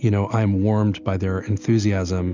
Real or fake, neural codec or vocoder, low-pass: real; none; 7.2 kHz